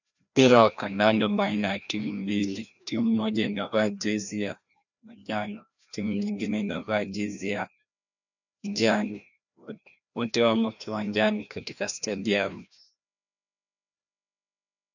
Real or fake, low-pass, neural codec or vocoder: fake; 7.2 kHz; codec, 16 kHz, 1 kbps, FreqCodec, larger model